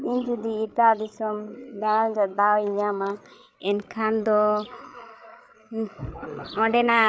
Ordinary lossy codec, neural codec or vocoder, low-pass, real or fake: none; codec, 16 kHz, 8 kbps, FreqCodec, larger model; none; fake